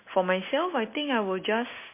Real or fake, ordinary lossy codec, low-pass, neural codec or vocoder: real; MP3, 24 kbps; 3.6 kHz; none